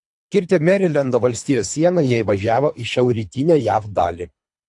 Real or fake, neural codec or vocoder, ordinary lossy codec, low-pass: fake; codec, 24 kHz, 3 kbps, HILCodec; AAC, 64 kbps; 10.8 kHz